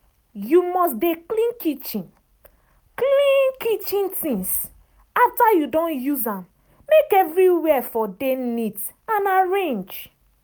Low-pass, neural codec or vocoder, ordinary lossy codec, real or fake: none; none; none; real